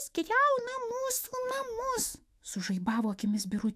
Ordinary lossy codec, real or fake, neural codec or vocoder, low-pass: AAC, 96 kbps; fake; autoencoder, 48 kHz, 128 numbers a frame, DAC-VAE, trained on Japanese speech; 14.4 kHz